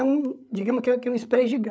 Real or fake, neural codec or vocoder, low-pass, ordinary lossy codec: fake; codec, 16 kHz, 8 kbps, FreqCodec, larger model; none; none